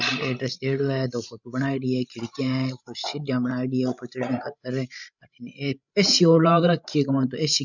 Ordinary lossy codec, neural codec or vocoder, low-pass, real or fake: none; codec, 16 kHz, 16 kbps, FreqCodec, larger model; 7.2 kHz; fake